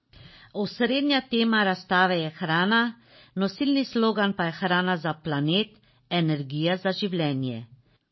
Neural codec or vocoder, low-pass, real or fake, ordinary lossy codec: none; 7.2 kHz; real; MP3, 24 kbps